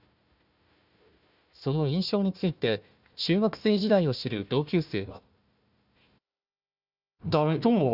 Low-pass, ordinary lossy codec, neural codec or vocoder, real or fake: 5.4 kHz; none; codec, 16 kHz, 1 kbps, FunCodec, trained on Chinese and English, 50 frames a second; fake